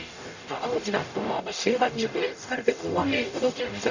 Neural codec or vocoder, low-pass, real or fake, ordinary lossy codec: codec, 44.1 kHz, 0.9 kbps, DAC; 7.2 kHz; fake; none